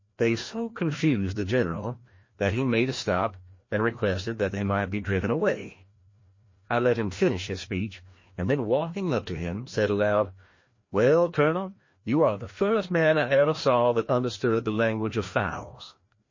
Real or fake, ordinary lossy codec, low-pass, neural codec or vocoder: fake; MP3, 32 kbps; 7.2 kHz; codec, 16 kHz, 1 kbps, FreqCodec, larger model